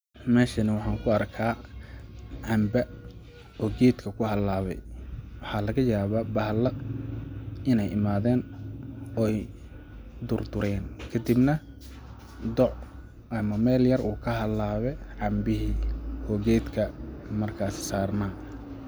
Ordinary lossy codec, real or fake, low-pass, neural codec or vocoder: none; real; none; none